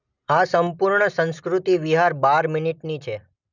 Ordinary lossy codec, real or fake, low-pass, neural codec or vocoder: none; real; 7.2 kHz; none